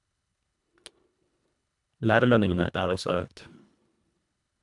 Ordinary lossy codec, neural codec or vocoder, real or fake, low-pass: none; codec, 24 kHz, 1.5 kbps, HILCodec; fake; 10.8 kHz